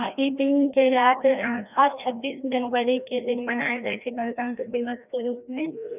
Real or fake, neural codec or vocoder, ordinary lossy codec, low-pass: fake; codec, 16 kHz, 1 kbps, FreqCodec, larger model; none; 3.6 kHz